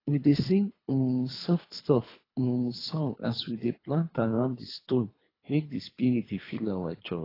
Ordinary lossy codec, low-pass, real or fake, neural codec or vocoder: AAC, 24 kbps; 5.4 kHz; fake; codec, 24 kHz, 3 kbps, HILCodec